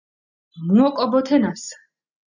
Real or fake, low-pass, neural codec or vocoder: real; 7.2 kHz; none